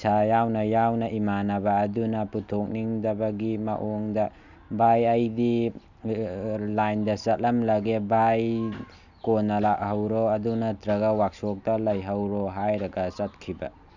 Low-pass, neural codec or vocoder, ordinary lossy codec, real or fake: 7.2 kHz; none; none; real